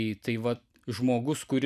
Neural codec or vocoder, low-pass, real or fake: vocoder, 44.1 kHz, 128 mel bands every 256 samples, BigVGAN v2; 14.4 kHz; fake